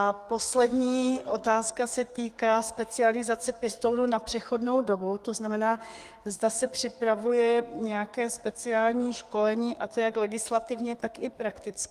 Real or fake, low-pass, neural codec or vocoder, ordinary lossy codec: fake; 14.4 kHz; codec, 32 kHz, 1.9 kbps, SNAC; Opus, 32 kbps